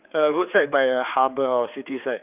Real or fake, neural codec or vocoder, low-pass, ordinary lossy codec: fake; codec, 16 kHz, 4 kbps, X-Codec, HuBERT features, trained on general audio; 3.6 kHz; none